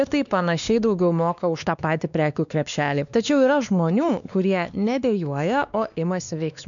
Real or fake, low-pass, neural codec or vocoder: fake; 7.2 kHz; codec, 16 kHz, 2 kbps, X-Codec, WavLM features, trained on Multilingual LibriSpeech